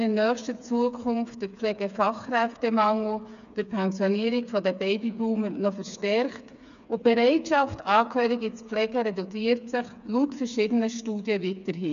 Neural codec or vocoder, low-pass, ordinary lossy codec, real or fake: codec, 16 kHz, 4 kbps, FreqCodec, smaller model; 7.2 kHz; none; fake